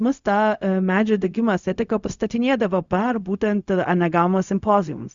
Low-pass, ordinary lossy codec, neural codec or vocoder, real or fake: 7.2 kHz; Opus, 64 kbps; codec, 16 kHz, 0.4 kbps, LongCat-Audio-Codec; fake